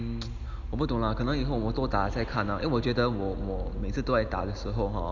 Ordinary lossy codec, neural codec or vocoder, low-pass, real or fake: none; none; 7.2 kHz; real